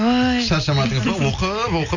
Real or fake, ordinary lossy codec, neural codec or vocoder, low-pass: real; none; none; 7.2 kHz